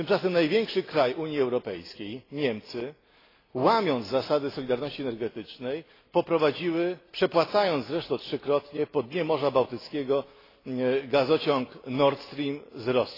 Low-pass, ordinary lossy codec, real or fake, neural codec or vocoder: 5.4 kHz; AAC, 24 kbps; real; none